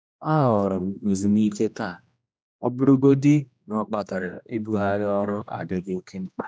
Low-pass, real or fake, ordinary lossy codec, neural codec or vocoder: none; fake; none; codec, 16 kHz, 1 kbps, X-Codec, HuBERT features, trained on general audio